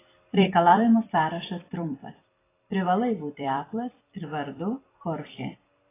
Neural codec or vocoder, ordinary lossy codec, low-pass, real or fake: vocoder, 44.1 kHz, 128 mel bands every 256 samples, BigVGAN v2; AAC, 16 kbps; 3.6 kHz; fake